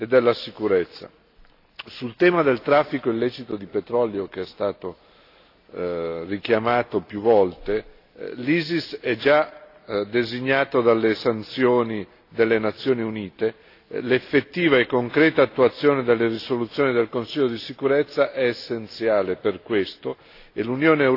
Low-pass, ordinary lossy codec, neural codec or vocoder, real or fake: 5.4 kHz; AAC, 32 kbps; none; real